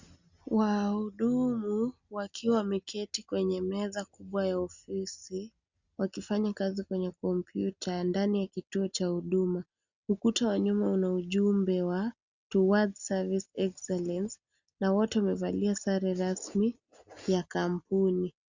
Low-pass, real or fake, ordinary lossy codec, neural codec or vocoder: 7.2 kHz; real; Opus, 64 kbps; none